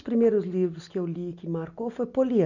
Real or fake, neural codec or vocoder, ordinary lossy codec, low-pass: real; none; none; 7.2 kHz